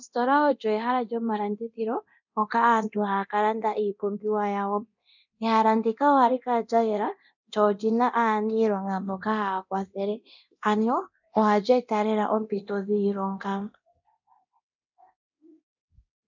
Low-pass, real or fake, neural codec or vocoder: 7.2 kHz; fake; codec, 24 kHz, 0.9 kbps, DualCodec